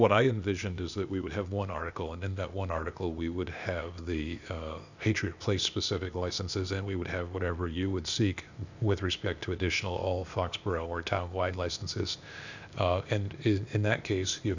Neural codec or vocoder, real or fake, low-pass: codec, 16 kHz, 0.8 kbps, ZipCodec; fake; 7.2 kHz